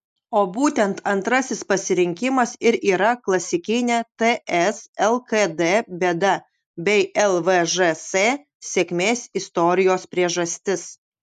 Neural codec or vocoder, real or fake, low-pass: none; real; 14.4 kHz